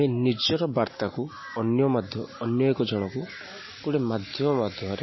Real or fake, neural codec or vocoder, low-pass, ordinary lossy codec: real; none; 7.2 kHz; MP3, 24 kbps